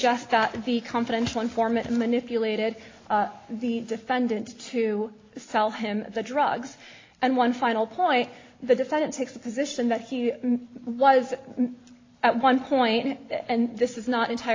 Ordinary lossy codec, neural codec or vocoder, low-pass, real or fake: AAC, 32 kbps; none; 7.2 kHz; real